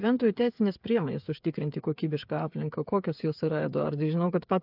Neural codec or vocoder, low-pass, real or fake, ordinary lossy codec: codec, 16 kHz, 8 kbps, FreqCodec, smaller model; 5.4 kHz; fake; AAC, 48 kbps